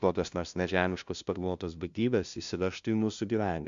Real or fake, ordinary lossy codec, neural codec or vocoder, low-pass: fake; Opus, 64 kbps; codec, 16 kHz, 0.5 kbps, FunCodec, trained on LibriTTS, 25 frames a second; 7.2 kHz